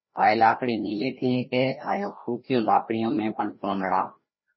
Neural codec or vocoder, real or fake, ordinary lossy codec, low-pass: codec, 16 kHz, 1 kbps, FreqCodec, larger model; fake; MP3, 24 kbps; 7.2 kHz